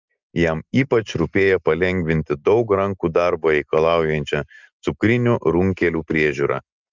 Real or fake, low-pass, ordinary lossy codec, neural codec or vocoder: real; 7.2 kHz; Opus, 32 kbps; none